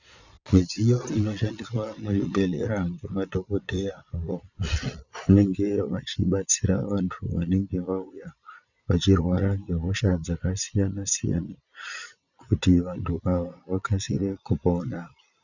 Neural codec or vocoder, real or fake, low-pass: vocoder, 22.05 kHz, 80 mel bands, Vocos; fake; 7.2 kHz